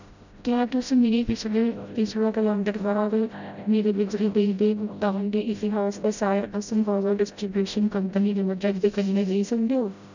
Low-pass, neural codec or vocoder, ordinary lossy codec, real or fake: 7.2 kHz; codec, 16 kHz, 0.5 kbps, FreqCodec, smaller model; none; fake